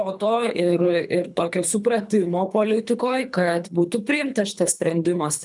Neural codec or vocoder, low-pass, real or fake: codec, 24 kHz, 3 kbps, HILCodec; 10.8 kHz; fake